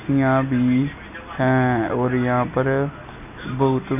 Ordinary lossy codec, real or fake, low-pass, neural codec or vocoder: none; real; 3.6 kHz; none